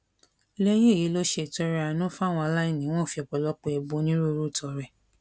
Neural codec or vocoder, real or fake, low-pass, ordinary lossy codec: none; real; none; none